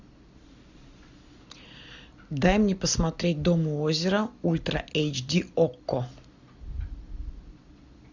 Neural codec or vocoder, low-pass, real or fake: none; 7.2 kHz; real